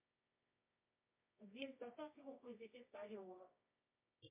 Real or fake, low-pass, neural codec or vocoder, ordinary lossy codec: fake; 3.6 kHz; codec, 24 kHz, 0.9 kbps, WavTokenizer, medium music audio release; MP3, 24 kbps